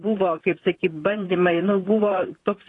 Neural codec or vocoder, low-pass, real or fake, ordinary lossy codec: vocoder, 44.1 kHz, 128 mel bands, Pupu-Vocoder; 10.8 kHz; fake; AAC, 32 kbps